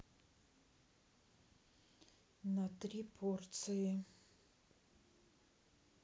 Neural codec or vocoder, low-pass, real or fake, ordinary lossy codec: none; none; real; none